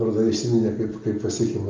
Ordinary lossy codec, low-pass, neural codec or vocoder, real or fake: Opus, 24 kbps; 7.2 kHz; none; real